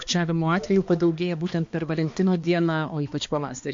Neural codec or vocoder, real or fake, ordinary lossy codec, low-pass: codec, 16 kHz, 2 kbps, X-Codec, HuBERT features, trained on balanced general audio; fake; MP3, 48 kbps; 7.2 kHz